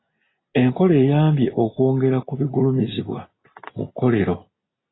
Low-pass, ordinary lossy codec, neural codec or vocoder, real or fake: 7.2 kHz; AAC, 16 kbps; none; real